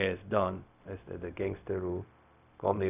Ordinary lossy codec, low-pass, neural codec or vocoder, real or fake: none; 3.6 kHz; codec, 16 kHz, 0.4 kbps, LongCat-Audio-Codec; fake